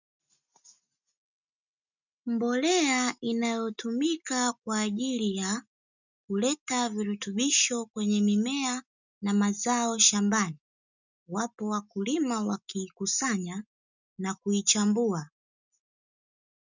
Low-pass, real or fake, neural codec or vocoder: 7.2 kHz; real; none